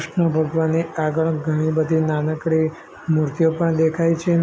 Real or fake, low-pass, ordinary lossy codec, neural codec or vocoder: real; none; none; none